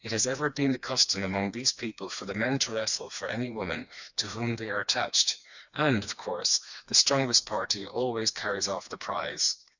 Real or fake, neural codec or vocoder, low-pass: fake; codec, 16 kHz, 2 kbps, FreqCodec, smaller model; 7.2 kHz